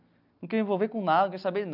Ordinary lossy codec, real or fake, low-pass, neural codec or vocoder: none; real; 5.4 kHz; none